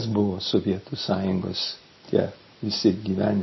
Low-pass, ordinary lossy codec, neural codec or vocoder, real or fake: 7.2 kHz; MP3, 24 kbps; none; real